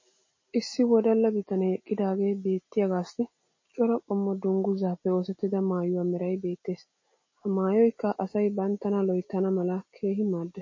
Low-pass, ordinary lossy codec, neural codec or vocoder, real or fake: 7.2 kHz; MP3, 32 kbps; none; real